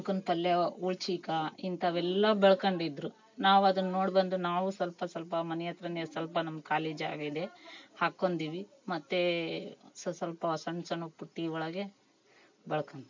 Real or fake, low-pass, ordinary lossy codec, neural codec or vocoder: real; 7.2 kHz; MP3, 48 kbps; none